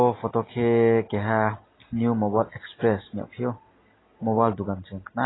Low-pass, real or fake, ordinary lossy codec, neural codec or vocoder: 7.2 kHz; real; AAC, 16 kbps; none